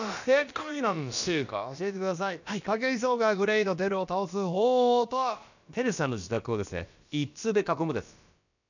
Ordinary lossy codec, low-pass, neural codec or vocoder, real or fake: none; 7.2 kHz; codec, 16 kHz, about 1 kbps, DyCAST, with the encoder's durations; fake